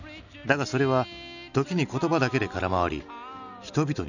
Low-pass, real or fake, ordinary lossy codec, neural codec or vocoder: 7.2 kHz; real; none; none